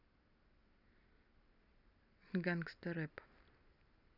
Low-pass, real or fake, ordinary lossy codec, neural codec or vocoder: 5.4 kHz; real; none; none